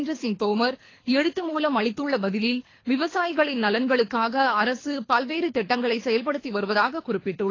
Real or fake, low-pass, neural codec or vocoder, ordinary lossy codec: fake; 7.2 kHz; codec, 24 kHz, 3 kbps, HILCodec; AAC, 32 kbps